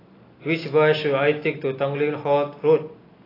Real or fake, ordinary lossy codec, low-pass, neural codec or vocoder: real; AAC, 24 kbps; 5.4 kHz; none